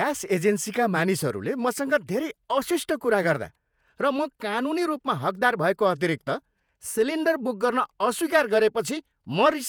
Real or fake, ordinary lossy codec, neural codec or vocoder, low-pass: fake; none; vocoder, 48 kHz, 128 mel bands, Vocos; none